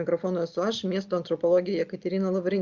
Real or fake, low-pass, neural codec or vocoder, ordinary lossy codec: real; 7.2 kHz; none; Opus, 64 kbps